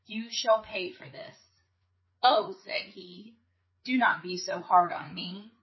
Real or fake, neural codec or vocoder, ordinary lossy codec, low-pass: fake; codec, 16 kHz, 4 kbps, FreqCodec, larger model; MP3, 24 kbps; 7.2 kHz